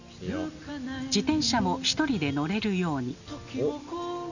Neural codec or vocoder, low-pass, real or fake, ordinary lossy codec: none; 7.2 kHz; real; none